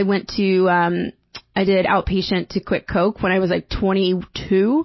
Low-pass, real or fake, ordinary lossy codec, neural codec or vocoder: 7.2 kHz; real; MP3, 24 kbps; none